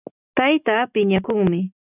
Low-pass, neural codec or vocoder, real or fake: 3.6 kHz; none; real